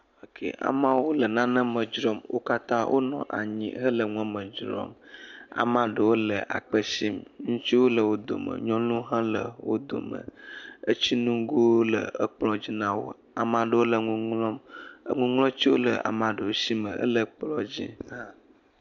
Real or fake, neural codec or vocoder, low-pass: real; none; 7.2 kHz